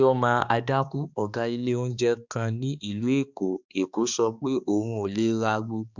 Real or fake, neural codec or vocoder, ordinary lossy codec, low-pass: fake; codec, 16 kHz, 2 kbps, X-Codec, HuBERT features, trained on balanced general audio; Opus, 64 kbps; 7.2 kHz